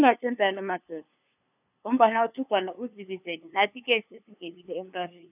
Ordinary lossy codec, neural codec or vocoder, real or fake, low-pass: none; codec, 16 kHz, 2 kbps, FunCodec, trained on LibriTTS, 25 frames a second; fake; 3.6 kHz